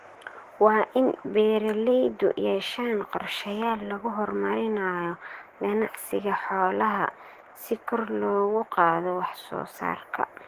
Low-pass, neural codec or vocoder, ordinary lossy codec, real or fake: 14.4 kHz; autoencoder, 48 kHz, 128 numbers a frame, DAC-VAE, trained on Japanese speech; Opus, 16 kbps; fake